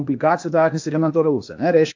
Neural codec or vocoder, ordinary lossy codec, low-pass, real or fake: codec, 16 kHz, 0.8 kbps, ZipCodec; AAC, 48 kbps; 7.2 kHz; fake